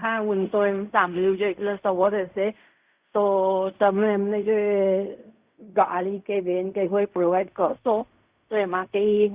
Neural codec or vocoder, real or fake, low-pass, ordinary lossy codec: codec, 16 kHz in and 24 kHz out, 0.4 kbps, LongCat-Audio-Codec, fine tuned four codebook decoder; fake; 3.6 kHz; Opus, 64 kbps